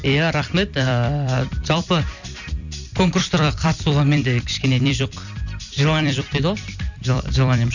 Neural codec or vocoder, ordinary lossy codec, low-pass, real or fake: vocoder, 44.1 kHz, 128 mel bands every 256 samples, BigVGAN v2; none; 7.2 kHz; fake